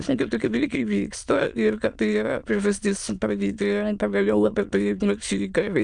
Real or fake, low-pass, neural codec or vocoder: fake; 9.9 kHz; autoencoder, 22.05 kHz, a latent of 192 numbers a frame, VITS, trained on many speakers